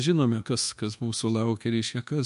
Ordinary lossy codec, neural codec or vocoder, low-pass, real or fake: MP3, 64 kbps; codec, 24 kHz, 1.2 kbps, DualCodec; 10.8 kHz; fake